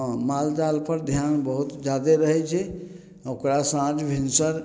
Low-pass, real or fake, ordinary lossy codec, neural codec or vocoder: none; real; none; none